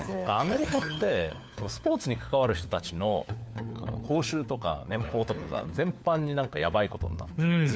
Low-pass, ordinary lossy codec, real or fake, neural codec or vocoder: none; none; fake; codec, 16 kHz, 4 kbps, FunCodec, trained on LibriTTS, 50 frames a second